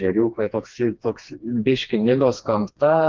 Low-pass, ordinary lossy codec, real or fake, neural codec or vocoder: 7.2 kHz; Opus, 24 kbps; fake; codec, 16 kHz, 2 kbps, FreqCodec, smaller model